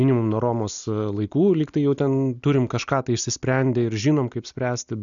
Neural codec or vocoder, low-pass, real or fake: none; 7.2 kHz; real